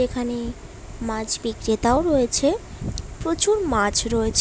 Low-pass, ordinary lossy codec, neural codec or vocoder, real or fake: none; none; none; real